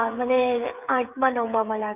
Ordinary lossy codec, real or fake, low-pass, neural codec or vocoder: none; fake; 3.6 kHz; codec, 16 kHz, 16 kbps, FreqCodec, smaller model